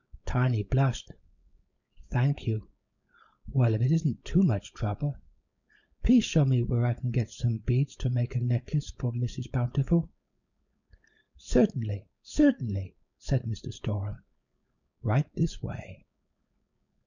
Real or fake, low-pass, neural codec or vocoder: fake; 7.2 kHz; codec, 16 kHz, 4.8 kbps, FACodec